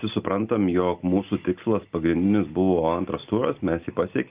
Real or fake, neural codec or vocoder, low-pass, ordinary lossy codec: real; none; 3.6 kHz; Opus, 24 kbps